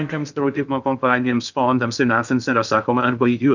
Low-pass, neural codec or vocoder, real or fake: 7.2 kHz; codec, 16 kHz in and 24 kHz out, 0.6 kbps, FocalCodec, streaming, 2048 codes; fake